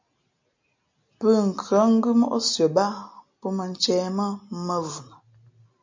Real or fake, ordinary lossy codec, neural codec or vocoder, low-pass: real; MP3, 64 kbps; none; 7.2 kHz